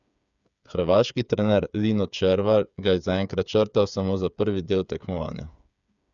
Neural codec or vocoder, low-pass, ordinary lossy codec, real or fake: codec, 16 kHz, 8 kbps, FreqCodec, smaller model; 7.2 kHz; none; fake